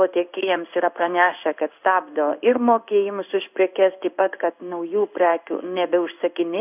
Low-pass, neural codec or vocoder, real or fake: 3.6 kHz; codec, 16 kHz in and 24 kHz out, 1 kbps, XY-Tokenizer; fake